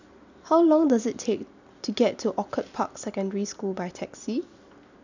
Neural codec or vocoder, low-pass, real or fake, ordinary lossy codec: none; 7.2 kHz; real; none